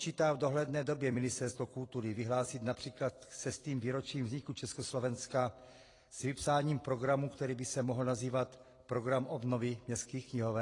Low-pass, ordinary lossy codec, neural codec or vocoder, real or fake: 10.8 kHz; AAC, 32 kbps; vocoder, 44.1 kHz, 128 mel bands every 256 samples, BigVGAN v2; fake